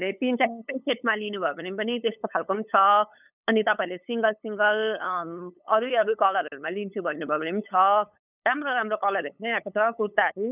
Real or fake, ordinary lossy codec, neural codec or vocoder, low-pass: fake; none; codec, 16 kHz, 8 kbps, FunCodec, trained on LibriTTS, 25 frames a second; 3.6 kHz